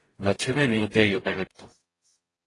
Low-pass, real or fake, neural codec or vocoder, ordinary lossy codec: 10.8 kHz; fake; codec, 44.1 kHz, 0.9 kbps, DAC; AAC, 32 kbps